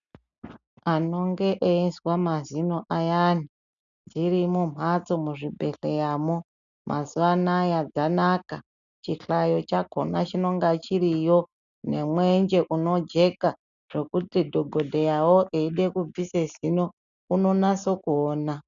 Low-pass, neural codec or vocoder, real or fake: 7.2 kHz; none; real